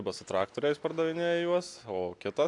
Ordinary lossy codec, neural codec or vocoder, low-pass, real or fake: AAC, 64 kbps; none; 10.8 kHz; real